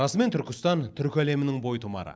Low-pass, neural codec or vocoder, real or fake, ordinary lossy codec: none; none; real; none